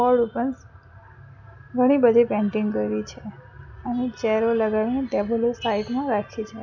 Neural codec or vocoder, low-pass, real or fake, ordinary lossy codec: none; 7.2 kHz; real; none